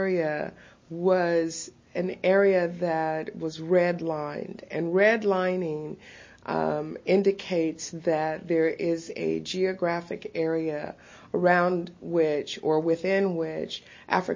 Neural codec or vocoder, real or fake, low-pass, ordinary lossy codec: none; real; 7.2 kHz; MP3, 32 kbps